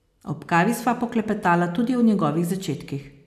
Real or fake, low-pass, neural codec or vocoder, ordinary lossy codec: real; 14.4 kHz; none; AAC, 96 kbps